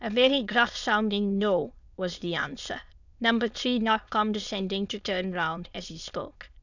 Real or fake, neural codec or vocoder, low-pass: fake; autoencoder, 22.05 kHz, a latent of 192 numbers a frame, VITS, trained on many speakers; 7.2 kHz